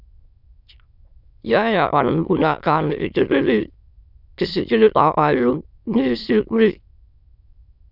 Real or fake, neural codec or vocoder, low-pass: fake; autoencoder, 22.05 kHz, a latent of 192 numbers a frame, VITS, trained on many speakers; 5.4 kHz